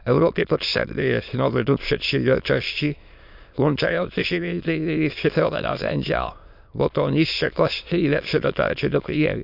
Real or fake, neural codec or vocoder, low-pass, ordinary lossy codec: fake; autoencoder, 22.05 kHz, a latent of 192 numbers a frame, VITS, trained on many speakers; 5.4 kHz; none